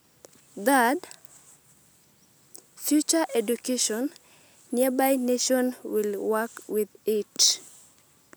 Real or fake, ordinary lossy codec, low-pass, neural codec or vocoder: real; none; none; none